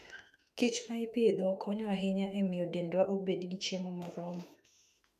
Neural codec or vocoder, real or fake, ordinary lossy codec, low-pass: autoencoder, 48 kHz, 32 numbers a frame, DAC-VAE, trained on Japanese speech; fake; none; 14.4 kHz